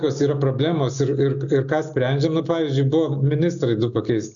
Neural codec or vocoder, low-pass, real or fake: none; 7.2 kHz; real